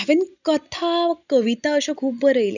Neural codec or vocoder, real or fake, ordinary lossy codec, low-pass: none; real; none; 7.2 kHz